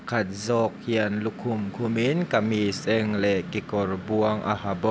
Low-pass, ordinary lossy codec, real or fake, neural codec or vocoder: none; none; real; none